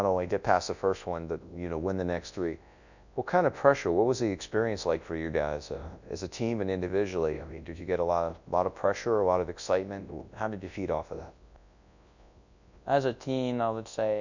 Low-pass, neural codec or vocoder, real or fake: 7.2 kHz; codec, 24 kHz, 0.9 kbps, WavTokenizer, large speech release; fake